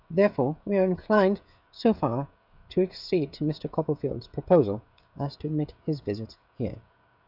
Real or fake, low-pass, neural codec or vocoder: fake; 5.4 kHz; codec, 16 kHz, 16 kbps, FreqCodec, smaller model